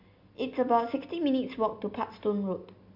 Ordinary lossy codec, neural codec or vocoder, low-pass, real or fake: MP3, 48 kbps; none; 5.4 kHz; real